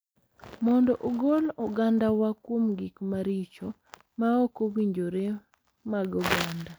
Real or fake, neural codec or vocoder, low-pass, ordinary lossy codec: real; none; none; none